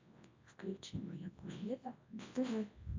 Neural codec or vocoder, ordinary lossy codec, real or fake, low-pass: codec, 24 kHz, 0.9 kbps, WavTokenizer, large speech release; MP3, 48 kbps; fake; 7.2 kHz